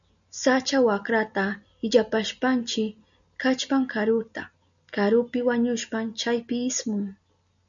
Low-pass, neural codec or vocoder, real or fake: 7.2 kHz; none; real